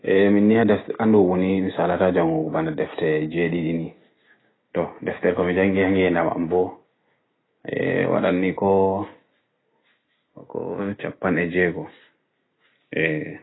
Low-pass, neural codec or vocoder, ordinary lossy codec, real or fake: 7.2 kHz; none; AAC, 16 kbps; real